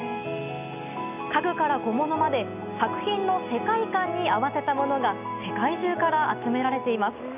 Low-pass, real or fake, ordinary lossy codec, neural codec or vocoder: 3.6 kHz; real; none; none